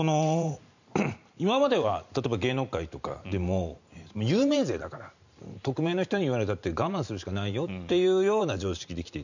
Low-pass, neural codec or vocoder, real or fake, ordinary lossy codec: 7.2 kHz; vocoder, 44.1 kHz, 128 mel bands every 512 samples, BigVGAN v2; fake; none